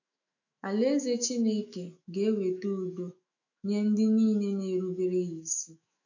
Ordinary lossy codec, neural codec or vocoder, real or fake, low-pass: none; autoencoder, 48 kHz, 128 numbers a frame, DAC-VAE, trained on Japanese speech; fake; 7.2 kHz